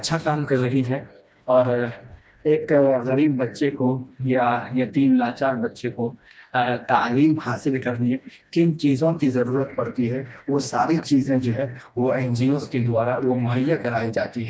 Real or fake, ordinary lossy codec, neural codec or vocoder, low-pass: fake; none; codec, 16 kHz, 1 kbps, FreqCodec, smaller model; none